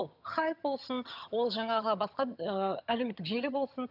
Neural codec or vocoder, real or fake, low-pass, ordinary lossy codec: vocoder, 22.05 kHz, 80 mel bands, HiFi-GAN; fake; 5.4 kHz; none